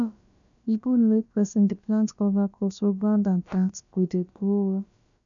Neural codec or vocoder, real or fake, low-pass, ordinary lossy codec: codec, 16 kHz, about 1 kbps, DyCAST, with the encoder's durations; fake; 7.2 kHz; none